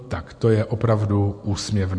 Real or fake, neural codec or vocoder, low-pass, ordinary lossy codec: real; none; 9.9 kHz; MP3, 48 kbps